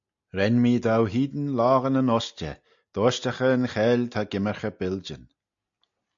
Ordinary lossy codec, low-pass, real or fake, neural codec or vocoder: AAC, 64 kbps; 7.2 kHz; real; none